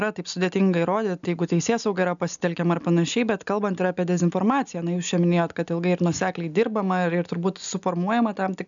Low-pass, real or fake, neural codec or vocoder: 7.2 kHz; real; none